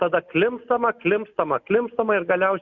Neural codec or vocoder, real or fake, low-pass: none; real; 7.2 kHz